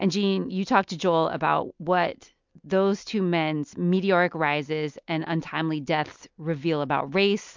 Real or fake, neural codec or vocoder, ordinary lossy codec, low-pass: real; none; MP3, 64 kbps; 7.2 kHz